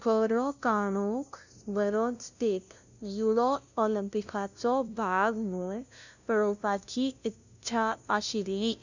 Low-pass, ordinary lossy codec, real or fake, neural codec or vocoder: 7.2 kHz; none; fake; codec, 16 kHz, 0.5 kbps, FunCodec, trained on LibriTTS, 25 frames a second